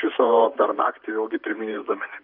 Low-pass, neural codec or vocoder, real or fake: 5.4 kHz; vocoder, 44.1 kHz, 128 mel bands, Pupu-Vocoder; fake